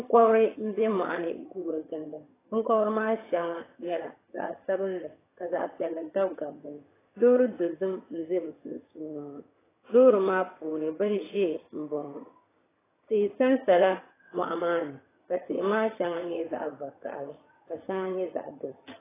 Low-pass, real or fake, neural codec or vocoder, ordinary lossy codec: 3.6 kHz; fake; vocoder, 22.05 kHz, 80 mel bands, HiFi-GAN; AAC, 16 kbps